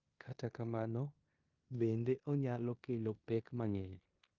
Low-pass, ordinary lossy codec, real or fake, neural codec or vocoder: 7.2 kHz; Opus, 24 kbps; fake; codec, 16 kHz in and 24 kHz out, 0.9 kbps, LongCat-Audio-Codec, four codebook decoder